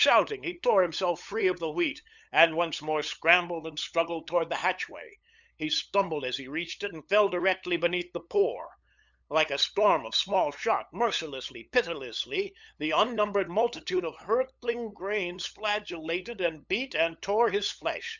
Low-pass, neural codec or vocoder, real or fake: 7.2 kHz; codec, 16 kHz, 8 kbps, FunCodec, trained on LibriTTS, 25 frames a second; fake